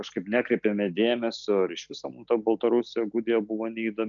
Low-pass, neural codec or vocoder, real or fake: 7.2 kHz; none; real